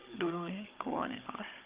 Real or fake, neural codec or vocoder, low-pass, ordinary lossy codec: fake; codec, 16 kHz, 16 kbps, FunCodec, trained on Chinese and English, 50 frames a second; 3.6 kHz; Opus, 32 kbps